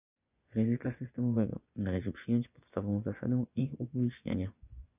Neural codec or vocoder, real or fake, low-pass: vocoder, 24 kHz, 100 mel bands, Vocos; fake; 3.6 kHz